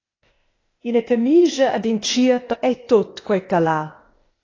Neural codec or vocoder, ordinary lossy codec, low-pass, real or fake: codec, 16 kHz, 0.8 kbps, ZipCodec; AAC, 32 kbps; 7.2 kHz; fake